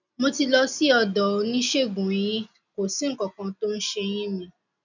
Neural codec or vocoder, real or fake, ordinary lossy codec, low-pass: none; real; none; 7.2 kHz